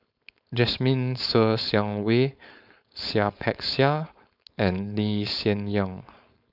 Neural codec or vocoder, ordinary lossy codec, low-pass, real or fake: codec, 16 kHz, 4.8 kbps, FACodec; none; 5.4 kHz; fake